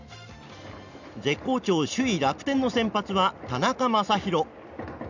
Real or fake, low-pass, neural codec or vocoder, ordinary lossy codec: real; 7.2 kHz; none; none